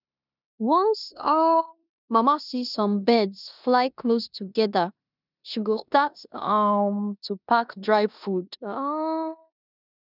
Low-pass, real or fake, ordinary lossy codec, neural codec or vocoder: 5.4 kHz; fake; none; codec, 16 kHz in and 24 kHz out, 0.9 kbps, LongCat-Audio-Codec, four codebook decoder